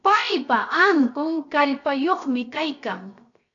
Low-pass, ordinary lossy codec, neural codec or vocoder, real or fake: 7.2 kHz; AAC, 48 kbps; codec, 16 kHz, 0.7 kbps, FocalCodec; fake